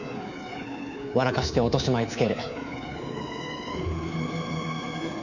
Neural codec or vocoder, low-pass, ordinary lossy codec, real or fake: codec, 24 kHz, 3.1 kbps, DualCodec; 7.2 kHz; none; fake